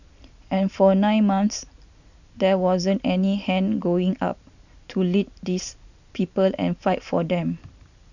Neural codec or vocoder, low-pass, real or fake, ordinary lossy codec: none; 7.2 kHz; real; none